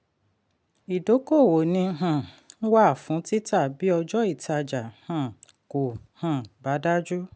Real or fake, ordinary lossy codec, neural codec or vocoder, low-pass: real; none; none; none